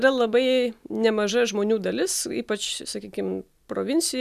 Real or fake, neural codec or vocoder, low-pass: real; none; 14.4 kHz